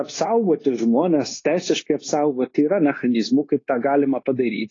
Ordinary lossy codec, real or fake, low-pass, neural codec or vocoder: AAC, 32 kbps; fake; 7.2 kHz; codec, 16 kHz, 0.9 kbps, LongCat-Audio-Codec